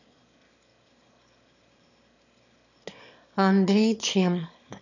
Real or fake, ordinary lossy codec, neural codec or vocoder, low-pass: fake; none; autoencoder, 22.05 kHz, a latent of 192 numbers a frame, VITS, trained on one speaker; 7.2 kHz